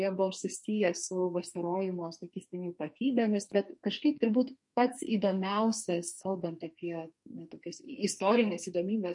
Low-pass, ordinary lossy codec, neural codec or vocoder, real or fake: 10.8 kHz; MP3, 48 kbps; codec, 44.1 kHz, 2.6 kbps, SNAC; fake